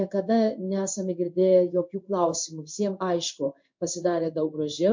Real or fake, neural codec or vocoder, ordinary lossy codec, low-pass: fake; codec, 16 kHz in and 24 kHz out, 1 kbps, XY-Tokenizer; MP3, 48 kbps; 7.2 kHz